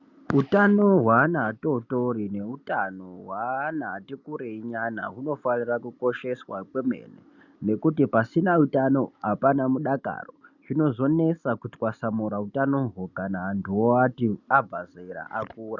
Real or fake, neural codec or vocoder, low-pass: real; none; 7.2 kHz